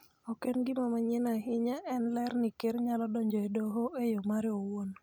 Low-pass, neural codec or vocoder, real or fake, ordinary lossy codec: none; none; real; none